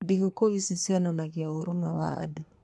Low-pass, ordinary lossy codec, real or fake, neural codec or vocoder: none; none; fake; codec, 24 kHz, 1 kbps, SNAC